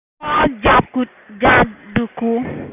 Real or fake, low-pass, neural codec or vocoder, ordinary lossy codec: real; 3.6 kHz; none; none